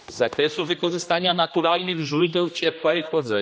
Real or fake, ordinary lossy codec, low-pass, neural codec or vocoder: fake; none; none; codec, 16 kHz, 1 kbps, X-Codec, HuBERT features, trained on general audio